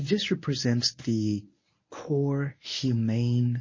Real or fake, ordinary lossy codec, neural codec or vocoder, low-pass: fake; MP3, 32 kbps; codec, 24 kHz, 0.9 kbps, WavTokenizer, medium speech release version 1; 7.2 kHz